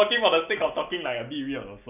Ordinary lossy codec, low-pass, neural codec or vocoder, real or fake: none; 3.6 kHz; none; real